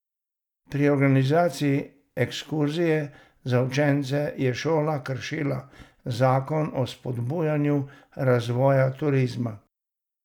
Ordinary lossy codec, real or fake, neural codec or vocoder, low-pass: none; real; none; 19.8 kHz